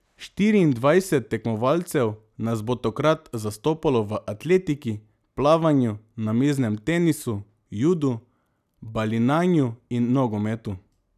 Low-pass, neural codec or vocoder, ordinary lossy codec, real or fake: 14.4 kHz; none; none; real